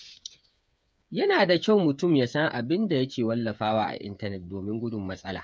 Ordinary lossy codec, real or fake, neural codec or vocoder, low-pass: none; fake; codec, 16 kHz, 8 kbps, FreqCodec, smaller model; none